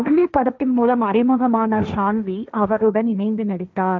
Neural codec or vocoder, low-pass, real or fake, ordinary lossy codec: codec, 16 kHz, 1.1 kbps, Voila-Tokenizer; none; fake; none